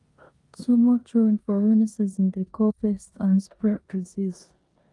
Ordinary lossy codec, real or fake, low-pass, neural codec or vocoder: Opus, 32 kbps; fake; 10.8 kHz; codec, 16 kHz in and 24 kHz out, 0.9 kbps, LongCat-Audio-Codec, four codebook decoder